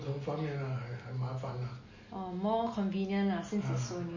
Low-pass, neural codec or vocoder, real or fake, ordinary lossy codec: 7.2 kHz; none; real; MP3, 32 kbps